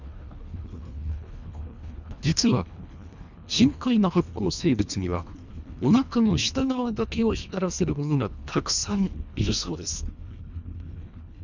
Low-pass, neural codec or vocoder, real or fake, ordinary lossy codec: 7.2 kHz; codec, 24 kHz, 1.5 kbps, HILCodec; fake; none